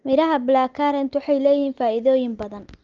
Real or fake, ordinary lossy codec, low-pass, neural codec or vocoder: real; Opus, 24 kbps; 7.2 kHz; none